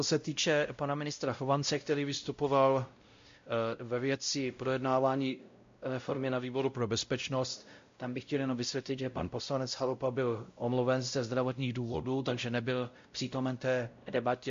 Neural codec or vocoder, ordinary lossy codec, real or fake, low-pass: codec, 16 kHz, 0.5 kbps, X-Codec, WavLM features, trained on Multilingual LibriSpeech; MP3, 48 kbps; fake; 7.2 kHz